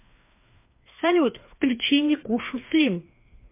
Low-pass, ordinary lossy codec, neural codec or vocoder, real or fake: 3.6 kHz; MP3, 24 kbps; codec, 16 kHz, 2 kbps, FreqCodec, larger model; fake